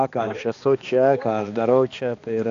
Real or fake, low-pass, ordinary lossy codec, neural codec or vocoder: fake; 7.2 kHz; AAC, 48 kbps; codec, 16 kHz, 2 kbps, X-Codec, HuBERT features, trained on balanced general audio